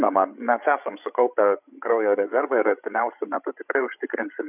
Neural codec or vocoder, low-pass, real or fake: codec, 16 kHz, 16 kbps, FreqCodec, larger model; 3.6 kHz; fake